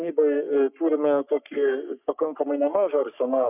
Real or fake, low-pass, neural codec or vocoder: fake; 3.6 kHz; codec, 44.1 kHz, 3.4 kbps, Pupu-Codec